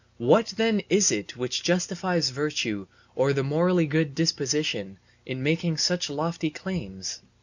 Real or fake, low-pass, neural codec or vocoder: real; 7.2 kHz; none